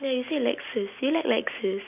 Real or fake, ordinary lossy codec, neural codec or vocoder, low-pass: real; none; none; 3.6 kHz